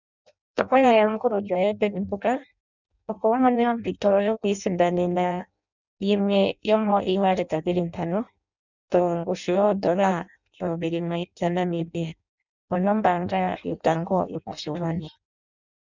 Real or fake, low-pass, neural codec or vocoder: fake; 7.2 kHz; codec, 16 kHz in and 24 kHz out, 0.6 kbps, FireRedTTS-2 codec